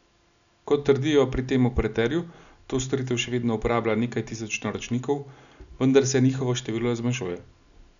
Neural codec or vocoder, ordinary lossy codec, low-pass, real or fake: none; none; 7.2 kHz; real